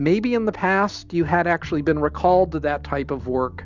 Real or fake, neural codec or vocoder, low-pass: real; none; 7.2 kHz